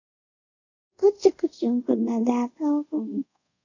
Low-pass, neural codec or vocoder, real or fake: 7.2 kHz; codec, 24 kHz, 0.5 kbps, DualCodec; fake